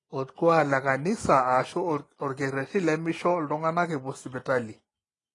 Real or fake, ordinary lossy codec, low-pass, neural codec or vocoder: real; AAC, 32 kbps; 10.8 kHz; none